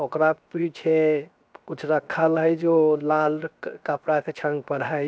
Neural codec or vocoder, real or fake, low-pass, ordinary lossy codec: codec, 16 kHz, 0.7 kbps, FocalCodec; fake; none; none